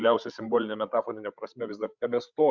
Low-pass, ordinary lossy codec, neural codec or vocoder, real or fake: 7.2 kHz; Opus, 64 kbps; codec, 16 kHz, 16 kbps, FreqCodec, larger model; fake